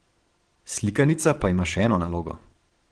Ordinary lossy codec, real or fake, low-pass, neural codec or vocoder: Opus, 16 kbps; fake; 9.9 kHz; vocoder, 22.05 kHz, 80 mel bands, Vocos